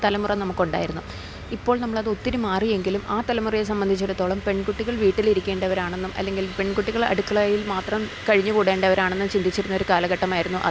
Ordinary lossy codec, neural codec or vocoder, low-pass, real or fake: none; none; none; real